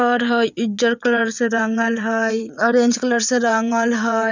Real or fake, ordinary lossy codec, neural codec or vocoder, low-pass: fake; none; vocoder, 22.05 kHz, 80 mel bands, WaveNeXt; 7.2 kHz